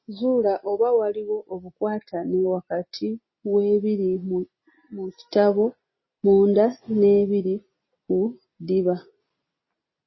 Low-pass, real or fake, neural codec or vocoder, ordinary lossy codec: 7.2 kHz; real; none; MP3, 24 kbps